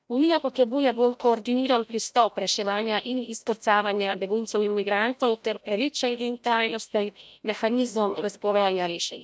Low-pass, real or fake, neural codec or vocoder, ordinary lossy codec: none; fake; codec, 16 kHz, 0.5 kbps, FreqCodec, larger model; none